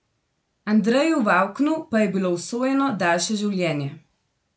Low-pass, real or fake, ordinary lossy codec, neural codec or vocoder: none; real; none; none